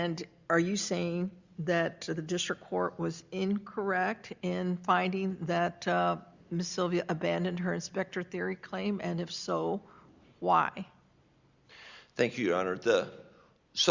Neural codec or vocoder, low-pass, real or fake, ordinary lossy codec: none; 7.2 kHz; real; Opus, 64 kbps